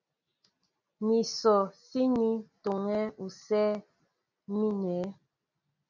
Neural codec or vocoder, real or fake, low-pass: none; real; 7.2 kHz